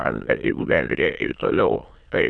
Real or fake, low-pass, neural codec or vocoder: fake; 9.9 kHz; autoencoder, 22.05 kHz, a latent of 192 numbers a frame, VITS, trained on many speakers